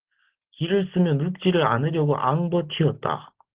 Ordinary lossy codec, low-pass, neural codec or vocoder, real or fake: Opus, 16 kbps; 3.6 kHz; none; real